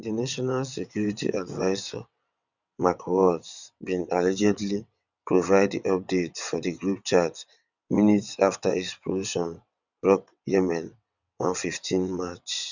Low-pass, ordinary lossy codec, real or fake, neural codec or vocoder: 7.2 kHz; none; fake; vocoder, 22.05 kHz, 80 mel bands, WaveNeXt